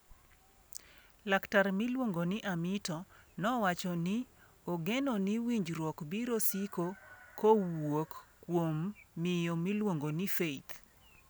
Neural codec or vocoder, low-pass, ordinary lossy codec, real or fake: none; none; none; real